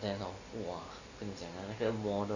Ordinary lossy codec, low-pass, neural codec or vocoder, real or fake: none; 7.2 kHz; none; real